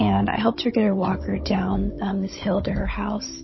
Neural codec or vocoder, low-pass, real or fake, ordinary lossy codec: codec, 16 kHz, 16 kbps, FunCodec, trained on Chinese and English, 50 frames a second; 7.2 kHz; fake; MP3, 24 kbps